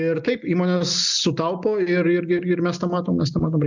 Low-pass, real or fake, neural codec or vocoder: 7.2 kHz; real; none